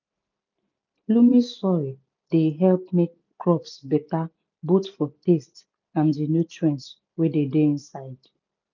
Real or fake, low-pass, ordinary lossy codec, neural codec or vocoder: real; 7.2 kHz; none; none